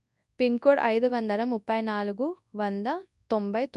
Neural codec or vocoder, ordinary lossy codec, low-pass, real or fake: codec, 24 kHz, 0.9 kbps, WavTokenizer, large speech release; none; 10.8 kHz; fake